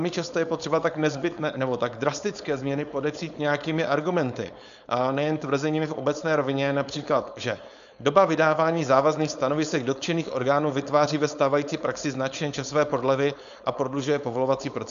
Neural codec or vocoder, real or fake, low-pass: codec, 16 kHz, 4.8 kbps, FACodec; fake; 7.2 kHz